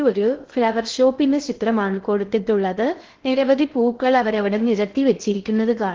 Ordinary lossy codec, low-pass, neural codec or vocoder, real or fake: Opus, 16 kbps; 7.2 kHz; codec, 16 kHz in and 24 kHz out, 0.6 kbps, FocalCodec, streaming, 2048 codes; fake